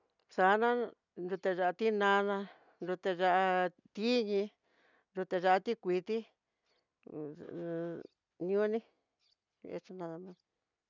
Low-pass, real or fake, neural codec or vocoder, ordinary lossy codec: 7.2 kHz; real; none; none